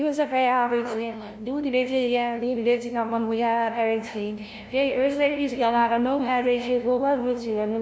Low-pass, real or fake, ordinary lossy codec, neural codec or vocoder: none; fake; none; codec, 16 kHz, 0.5 kbps, FunCodec, trained on LibriTTS, 25 frames a second